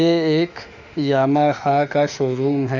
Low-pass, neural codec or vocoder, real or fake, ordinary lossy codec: 7.2 kHz; autoencoder, 48 kHz, 32 numbers a frame, DAC-VAE, trained on Japanese speech; fake; Opus, 64 kbps